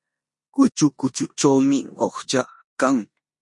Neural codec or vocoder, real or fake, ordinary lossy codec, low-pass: codec, 16 kHz in and 24 kHz out, 0.9 kbps, LongCat-Audio-Codec, four codebook decoder; fake; MP3, 48 kbps; 10.8 kHz